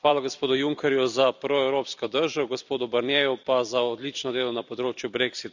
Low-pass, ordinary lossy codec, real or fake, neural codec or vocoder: 7.2 kHz; none; fake; vocoder, 44.1 kHz, 128 mel bands every 256 samples, BigVGAN v2